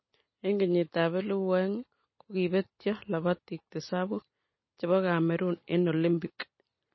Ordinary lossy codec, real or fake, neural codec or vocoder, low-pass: MP3, 24 kbps; real; none; 7.2 kHz